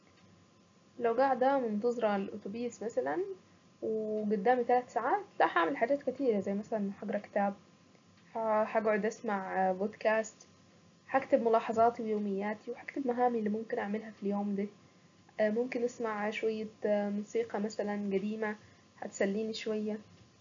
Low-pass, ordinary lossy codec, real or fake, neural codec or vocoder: 7.2 kHz; AAC, 64 kbps; real; none